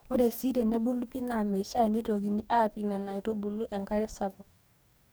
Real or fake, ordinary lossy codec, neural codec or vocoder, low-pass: fake; none; codec, 44.1 kHz, 2.6 kbps, DAC; none